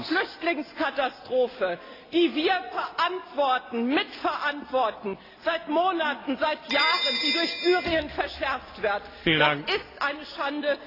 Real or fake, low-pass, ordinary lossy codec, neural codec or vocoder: real; 5.4 kHz; AAC, 24 kbps; none